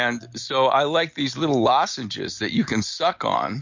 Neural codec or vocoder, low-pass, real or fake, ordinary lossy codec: none; 7.2 kHz; real; MP3, 48 kbps